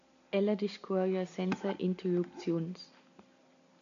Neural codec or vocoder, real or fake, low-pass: none; real; 7.2 kHz